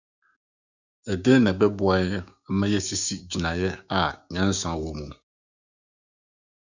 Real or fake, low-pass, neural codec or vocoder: fake; 7.2 kHz; codec, 16 kHz, 6 kbps, DAC